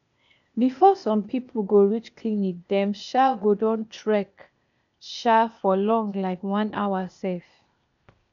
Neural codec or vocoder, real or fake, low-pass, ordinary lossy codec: codec, 16 kHz, 0.8 kbps, ZipCodec; fake; 7.2 kHz; none